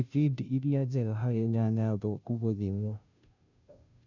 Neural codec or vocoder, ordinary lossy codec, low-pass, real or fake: codec, 16 kHz, 0.5 kbps, FunCodec, trained on Chinese and English, 25 frames a second; none; 7.2 kHz; fake